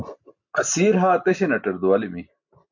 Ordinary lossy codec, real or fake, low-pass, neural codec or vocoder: MP3, 48 kbps; real; 7.2 kHz; none